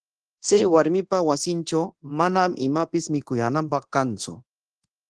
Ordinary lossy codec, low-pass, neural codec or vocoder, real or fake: Opus, 16 kbps; 10.8 kHz; codec, 24 kHz, 0.9 kbps, DualCodec; fake